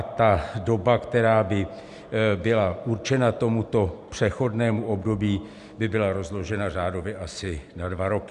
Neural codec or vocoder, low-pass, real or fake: none; 10.8 kHz; real